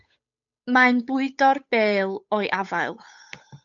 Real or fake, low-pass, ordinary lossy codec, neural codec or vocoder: fake; 7.2 kHz; MP3, 96 kbps; codec, 16 kHz, 8 kbps, FunCodec, trained on Chinese and English, 25 frames a second